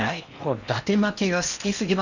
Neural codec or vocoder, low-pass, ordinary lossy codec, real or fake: codec, 16 kHz in and 24 kHz out, 0.8 kbps, FocalCodec, streaming, 65536 codes; 7.2 kHz; none; fake